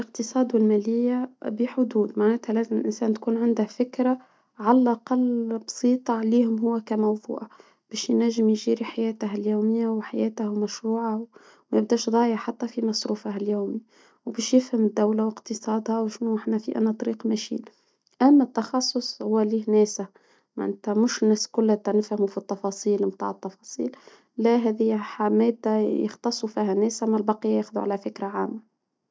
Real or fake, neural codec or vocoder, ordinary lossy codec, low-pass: real; none; none; none